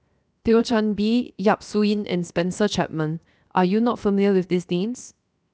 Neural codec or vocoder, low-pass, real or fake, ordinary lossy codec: codec, 16 kHz, 0.7 kbps, FocalCodec; none; fake; none